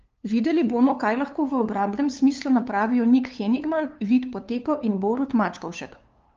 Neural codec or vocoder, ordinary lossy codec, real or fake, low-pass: codec, 16 kHz, 2 kbps, FunCodec, trained on LibriTTS, 25 frames a second; Opus, 24 kbps; fake; 7.2 kHz